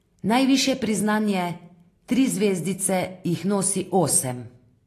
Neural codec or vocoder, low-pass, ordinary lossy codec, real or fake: none; 14.4 kHz; AAC, 48 kbps; real